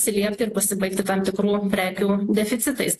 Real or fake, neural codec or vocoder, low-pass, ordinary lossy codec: fake; vocoder, 48 kHz, 128 mel bands, Vocos; 14.4 kHz; AAC, 48 kbps